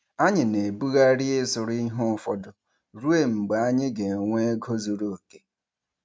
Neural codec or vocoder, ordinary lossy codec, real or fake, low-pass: none; none; real; none